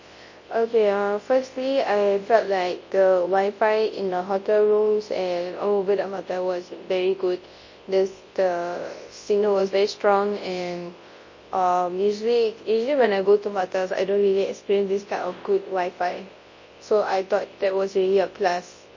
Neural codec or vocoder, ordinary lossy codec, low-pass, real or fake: codec, 24 kHz, 0.9 kbps, WavTokenizer, large speech release; MP3, 32 kbps; 7.2 kHz; fake